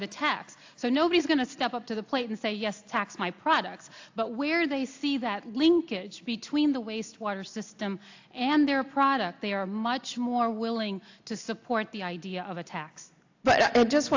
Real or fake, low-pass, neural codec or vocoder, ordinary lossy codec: real; 7.2 kHz; none; AAC, 48 kbps